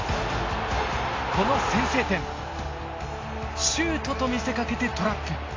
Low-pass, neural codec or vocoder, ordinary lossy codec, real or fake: 7.2 kHz; none; AAC, 32 kbps; real